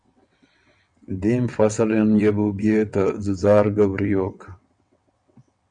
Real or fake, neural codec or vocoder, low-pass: fake; vocoder, 22.05 kHz, 80 mel bands, WaveNeXt; 9.9 kHz